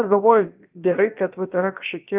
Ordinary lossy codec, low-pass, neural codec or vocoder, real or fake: Opus, 32 kbps; 3.6 kHz; codec, 16 kHz, about 1 kbps, DyCAST, with the encoder's durations; fake